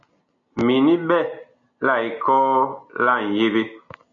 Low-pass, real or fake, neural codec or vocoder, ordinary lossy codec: 7.2 kHz; real; none; MP3, 96 kbps